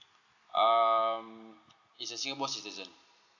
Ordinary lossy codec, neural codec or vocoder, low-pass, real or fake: none; none; 7.2 kHz; real